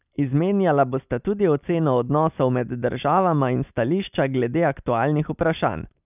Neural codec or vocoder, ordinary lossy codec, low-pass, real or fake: none; none; 3.6 kHz; real